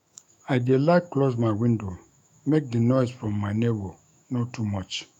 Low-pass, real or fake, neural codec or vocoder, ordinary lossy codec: 19.8 kHz; fake; autoencoder, 48 kHz, 128 numbers a frame, DAC-VAE, trained on Japanese speech; none